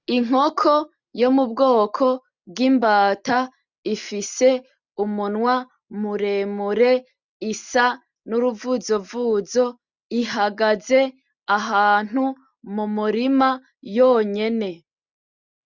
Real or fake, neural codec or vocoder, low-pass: real; none; 7.2 kHz